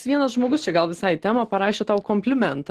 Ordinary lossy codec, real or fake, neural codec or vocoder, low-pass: Opus, 16 kbps; real; none; 14.4 kHz